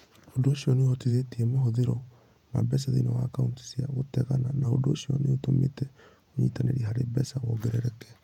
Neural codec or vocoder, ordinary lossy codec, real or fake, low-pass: vocoder, 48 kHz, 128 mel bands, Vocos; none; fake; 19.8 kHz